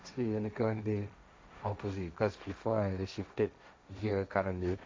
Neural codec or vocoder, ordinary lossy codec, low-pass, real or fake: codec, 16 kHz, 1.1 kbps, Voila-Tokenizer; none; none; fake